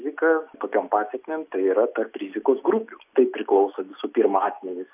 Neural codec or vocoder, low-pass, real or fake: none; 3.6 kHz; real